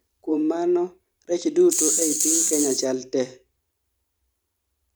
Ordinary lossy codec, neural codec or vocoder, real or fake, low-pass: none; vocoder, 44.1 kHz, 128 mel bands every 512 samples, BigVGAN v2; fake; none